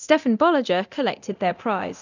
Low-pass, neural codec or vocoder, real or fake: 7.2 kHz; codec, 24 kHz, 0.9 kbps, DualCodec; fake